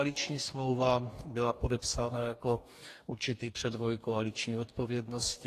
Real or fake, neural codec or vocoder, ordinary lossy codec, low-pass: fake; codec, 44.1 kHz, 2.6 kbps, DAC; AAC, 48 kbps; 14.4 kHz